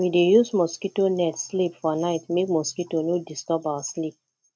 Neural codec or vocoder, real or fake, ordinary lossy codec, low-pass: none; real; none; none